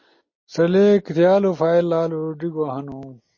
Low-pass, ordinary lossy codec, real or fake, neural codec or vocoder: 7.2 kHz; MP3, 32 kbps; real; none